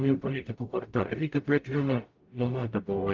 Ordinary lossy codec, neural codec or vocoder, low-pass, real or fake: Opus, 24 kbps; codec, 44.1 kHz, 0.9 kbps, DAC; 7.2 kHz; fake